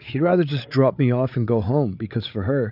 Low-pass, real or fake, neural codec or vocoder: 5.4 kHz; fake; codec, 16 kHz, 8 kbps, FreqCodec, larger model